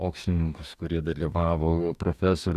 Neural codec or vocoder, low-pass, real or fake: codec, 44.1 kHz, 2.6 kbps, DAC; 14.4 kHz; fake